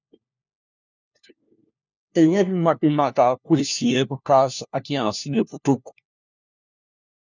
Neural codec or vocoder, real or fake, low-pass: codec, 16 kHz, 1 kbps, FunCodec, trained on LibriTTS, 50 frames a second; fake; 7.2 kHz